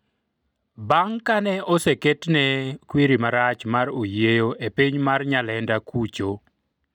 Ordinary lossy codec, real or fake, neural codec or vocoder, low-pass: none; real; none; 19.8 kHz